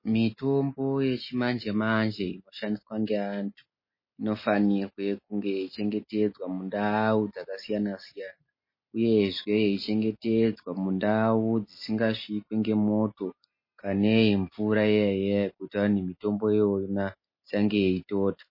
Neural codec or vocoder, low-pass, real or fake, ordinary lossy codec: none; 5.4 kHz; real; MP3, 24 kbps